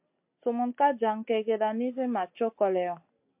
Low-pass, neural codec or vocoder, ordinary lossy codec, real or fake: 3.6 kHz; none; MP3, 32 kbps; real